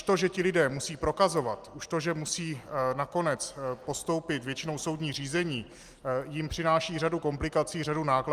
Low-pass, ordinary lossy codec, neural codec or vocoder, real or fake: 14.4 kHz; Opus, 32 kbps; none; real